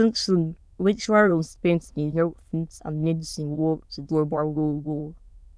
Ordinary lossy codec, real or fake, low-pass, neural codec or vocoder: none; fake; none; autoencoder, 22.05 kHz, a latent of 192 numbers a frame, VITS, trained on many speakers